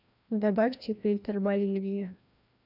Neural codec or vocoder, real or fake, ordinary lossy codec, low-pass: codec, 16 kHz, 1 kbps, FreqCodec, larger model; fake; none; 5.4 kHz